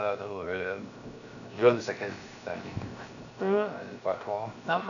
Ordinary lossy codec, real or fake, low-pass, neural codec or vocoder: none; fake; 7.2 kHz; codec, 16 kHz, 0.7 kbps, FocalCodec